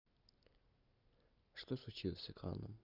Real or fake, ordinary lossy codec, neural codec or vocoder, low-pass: real; none; none; 5.4 kHz